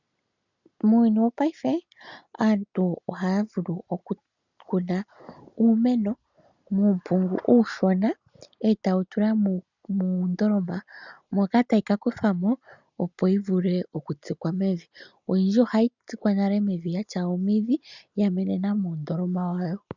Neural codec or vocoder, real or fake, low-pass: none; real; 7.2 kHz